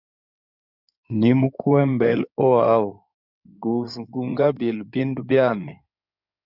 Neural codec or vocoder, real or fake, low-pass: codec, 16 kHz in and 24 kHz out, 2.2 kbps, FireRedTTS-2 codec; fake; 5.4 kHz